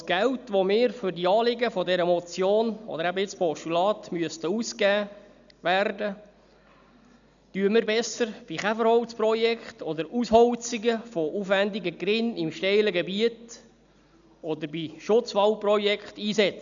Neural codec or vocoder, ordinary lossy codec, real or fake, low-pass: none; MP3, 96 kbps; real; 7.2 kHz